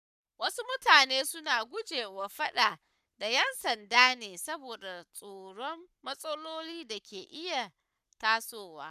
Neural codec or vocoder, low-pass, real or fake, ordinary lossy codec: codec, 44.1 kHz, 7.8 kbps, Pupu-Codec; 14.4 kHz; fake; none